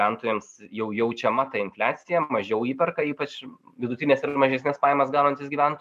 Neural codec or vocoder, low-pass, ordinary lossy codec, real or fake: none; 14.4 kHz; MP3, 96 kbps; real